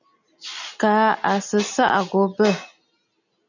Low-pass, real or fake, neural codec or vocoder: 7.2 kHz; real; none